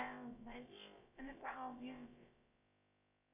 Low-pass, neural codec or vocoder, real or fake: 3.6 kHz; codec, 16 kHz, about 1 kbps, DyCAST, with the encoder's durations; fake